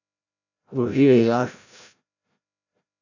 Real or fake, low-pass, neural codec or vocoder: fake; 7.2 kHz; codec, 16 kHz, 0.5 kbps, FreqCodec, larger model